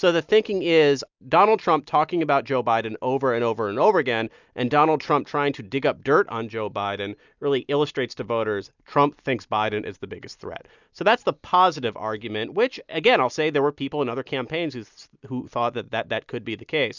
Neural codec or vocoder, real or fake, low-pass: none; real; 7.2 kHz